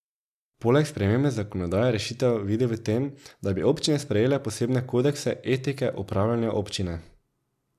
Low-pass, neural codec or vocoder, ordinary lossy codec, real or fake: 14.4 kHz; none; none; real